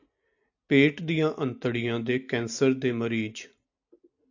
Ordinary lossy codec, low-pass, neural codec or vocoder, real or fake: AAC, 48 kbps; 7.2 kHz; none; real